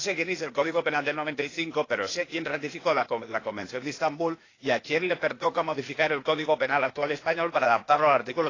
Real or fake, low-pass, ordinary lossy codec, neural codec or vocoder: fake; 7.2 kHz; AAC, 32 kbps; codec, 16 kHz, 0.8 kbps, ZipCodec